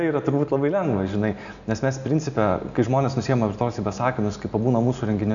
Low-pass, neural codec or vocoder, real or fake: 7.2 kHz; none; real